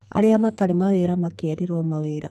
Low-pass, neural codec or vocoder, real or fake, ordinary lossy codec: 14.4 kHz; codec, 44.1 kHz, 2.6 kbps, SNAC; fake; none